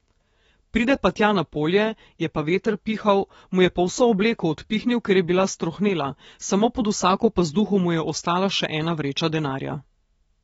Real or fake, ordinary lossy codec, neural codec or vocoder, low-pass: fake; AAC, 24 kbps; autoencoder, 48 kHz, 128 numbers a frame, DAC-VAE, trained on Japanese speech; 19.8 kHz